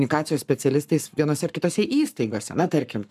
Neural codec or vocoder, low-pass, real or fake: codec, 44.1 kHz, 7.8 kbps, Pupu-Codec; 14.4 kHz; fake